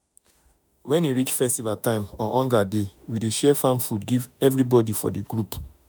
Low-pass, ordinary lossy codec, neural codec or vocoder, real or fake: none; none; autoencoder, 48 kHz, 32 numbers a frame, DAC-VAE, trained on Japanese speech; fake